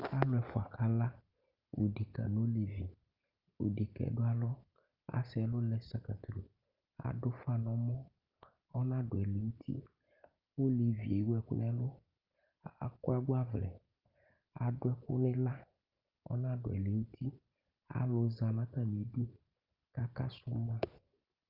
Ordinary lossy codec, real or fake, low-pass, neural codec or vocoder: Opus, 24 kbps; real; 5.4 kHz; none